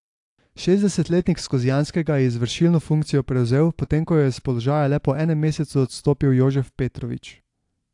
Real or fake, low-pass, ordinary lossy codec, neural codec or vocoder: real; 10.8 kHz; AAC, 64 kbps; none